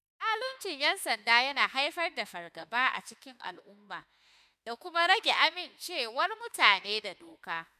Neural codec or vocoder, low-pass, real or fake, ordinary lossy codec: autoencoder, 48 kHz, 32 numbers a frame, DAC-VAE, trained on Japanese speech; 14.4 kHz; fake; none